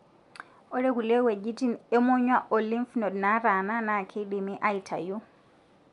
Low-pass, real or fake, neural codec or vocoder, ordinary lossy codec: 10.8 kHz; real; none; none